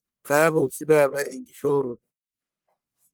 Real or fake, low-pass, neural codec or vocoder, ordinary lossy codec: fake; none; codec, 44.1 kHz, 1.7 kbps, Pupu-Codec; none